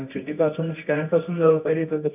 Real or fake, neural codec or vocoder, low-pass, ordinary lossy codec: fake; codec, 24 kHz, 0.9 kbps, WavTokenizer, medium music audio release; 3.6 kHz; none